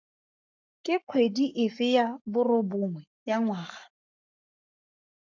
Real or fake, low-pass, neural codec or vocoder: fake; 7.2 kHz; codec, 44.1 kHz, 7.8 kbps, Pupu-Codec